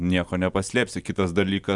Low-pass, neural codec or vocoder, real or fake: 10.8 kHz; none; real